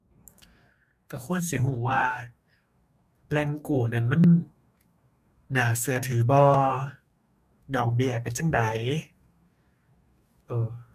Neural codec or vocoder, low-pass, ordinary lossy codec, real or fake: codec, 44.1 kHz, 2.6 kbps, DAC; 14.4 kHz; none; fake